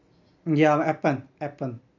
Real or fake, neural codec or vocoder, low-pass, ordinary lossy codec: real; none; 7.2 kHz; none